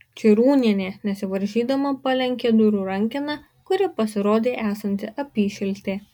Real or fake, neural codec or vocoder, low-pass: real; none; 19.8 kHz